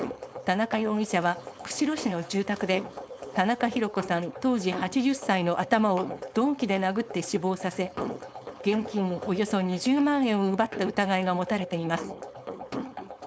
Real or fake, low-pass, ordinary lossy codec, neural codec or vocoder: fake; none; none; codec, 16 kHz, 4.8 kbps, FACodec